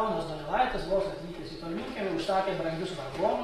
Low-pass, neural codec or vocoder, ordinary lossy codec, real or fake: 19.8 kHz; none; AAC, 32 kbps; real